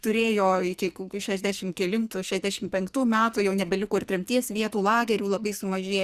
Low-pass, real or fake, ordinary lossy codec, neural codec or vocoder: 14.4 kHz; fake; Opus, 64 kbps; codec, 44.1 kHz, 2.6 kbps, SNAC